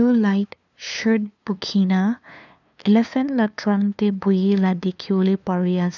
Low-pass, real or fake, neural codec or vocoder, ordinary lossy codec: 7.2 kHz; fake; codec, 16 kHz, 2 kbps, FunCodec, trained on LibriTTS, 25 frames a second; none